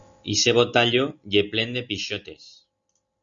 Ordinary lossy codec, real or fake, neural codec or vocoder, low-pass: Opus, 64 kbps; real; none; 7.2 kHz